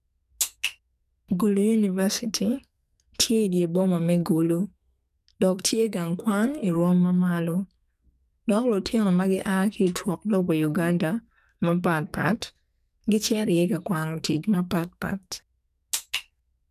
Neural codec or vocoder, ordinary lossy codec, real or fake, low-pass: codec, 44.1 kHz, 2.6 kbps, SNAC; none; fake; 14.4 kHz